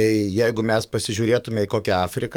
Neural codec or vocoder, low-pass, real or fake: codec, 44.1 kHz, 7.8 kbps, DAC; 19.8 kHz; fake